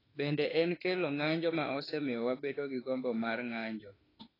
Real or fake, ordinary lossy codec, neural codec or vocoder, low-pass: fake; AAC, 24 kbps; autoencoder, 48 kHz, 32 numbers a frame, DAC-VAE, trained on Japanese speech; 5.4 kHz